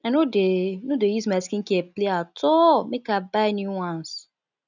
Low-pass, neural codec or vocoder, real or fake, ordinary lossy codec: 7.2 kHz; none; real; none